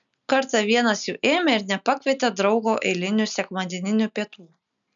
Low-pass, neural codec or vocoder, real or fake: 7.2 kHz; none; real